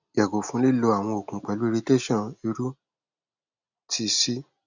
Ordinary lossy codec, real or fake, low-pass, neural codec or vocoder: none; real; 7.2 kHz; none